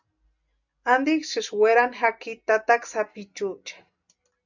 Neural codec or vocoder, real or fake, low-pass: none; real; 7.2 kHz